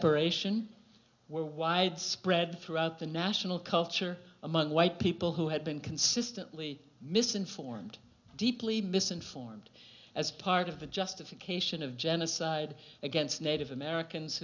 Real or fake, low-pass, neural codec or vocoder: real; 7.2 kHz; none